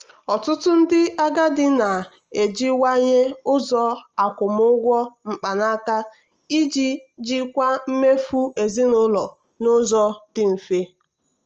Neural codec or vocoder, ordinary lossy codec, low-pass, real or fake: none; Opus, 24 kbps; 7.2 kHz; real